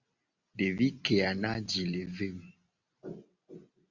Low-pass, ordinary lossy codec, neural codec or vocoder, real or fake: 7.2 kHz; Opus, 64 kbps; none; real